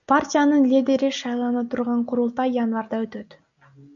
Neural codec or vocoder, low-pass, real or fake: none; 7.2 kHz; real